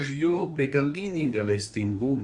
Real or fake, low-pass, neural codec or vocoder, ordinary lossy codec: fake; 10.8 kHz; codec, 24 kHz, 1 kbps, SNAC; Opus, 64 kbps